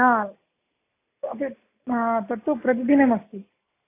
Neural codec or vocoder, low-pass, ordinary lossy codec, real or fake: none; 3.6 kHz; AAC, 24 kbps; real